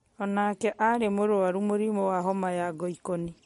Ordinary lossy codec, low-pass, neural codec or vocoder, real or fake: MP3, 48 kbps; 19.8 kHz; none; real